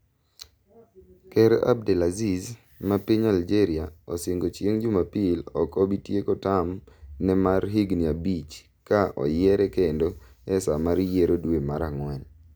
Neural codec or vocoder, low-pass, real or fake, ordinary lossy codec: none; none; real; none